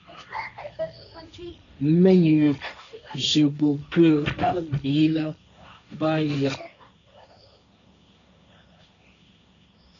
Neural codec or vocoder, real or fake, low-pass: codec, 16 kHz, 1.1 kbps, Voila-Tokenizer; fake; 7.2 kHz